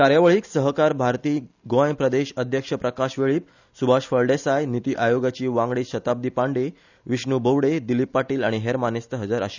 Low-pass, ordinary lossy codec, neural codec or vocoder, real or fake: 7.2 kHz; none; none; real